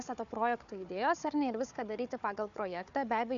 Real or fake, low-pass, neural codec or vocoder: fake; 7.2 kHz; codec, 16 kHz, 4 kbps, FunCodec, trained on Chinese and English, 50 frames a second